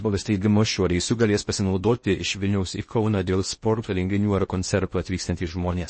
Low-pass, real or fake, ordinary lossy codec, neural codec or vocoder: 9.9 kHz; fake; MP3, 32 kbps; codec, 16 kHz in and 24 kHz out, 0.6 kbps, FocalCodec, streaming, 4096 codes